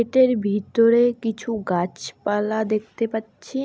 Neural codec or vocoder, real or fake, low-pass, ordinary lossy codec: none; real; none; none